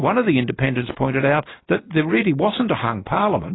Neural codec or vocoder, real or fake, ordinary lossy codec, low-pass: none; real; AAC, 16 kbps; 7.2 kHz